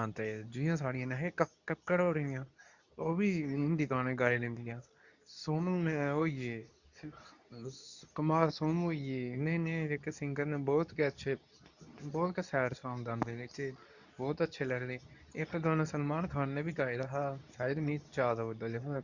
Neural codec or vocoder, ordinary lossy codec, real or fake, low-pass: codec, 24 kHz, 0.9 kbps, WavTokenizer, medium speech release version 2; none; fake; 7.2 kHz